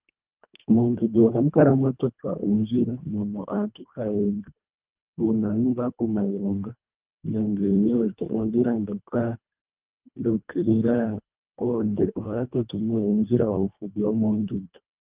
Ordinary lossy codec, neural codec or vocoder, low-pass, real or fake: Opus, 24 kbps; codec, 24 kHz, 1.5 kbps, HILCodec; 3.6 kHz; fake